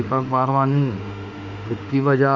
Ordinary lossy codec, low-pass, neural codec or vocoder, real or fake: none; 7.2 kHz; autoencoder, 48 kHz, 32 numbers a frame, DAC-VAE, trained on Japanese speech; fake